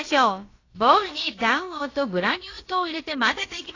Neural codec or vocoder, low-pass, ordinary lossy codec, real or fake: codec, 16 kHz, about 1 kbps, DyCAST, with the encoder's durations; 7.2 kHz; AAC, 32 kbps; fake